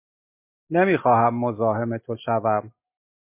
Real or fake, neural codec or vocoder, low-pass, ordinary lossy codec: real; none; 3.6 kHz; MP3, 24 kbps